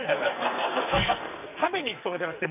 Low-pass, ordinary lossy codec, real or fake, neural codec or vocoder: 3.6 kHz; none; fake; codec, 44.1 kHz, 2.6 kbps, SNAC